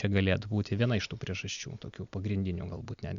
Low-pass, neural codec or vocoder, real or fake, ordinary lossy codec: 7.2 kHz; none; real; Opus, 64 kbps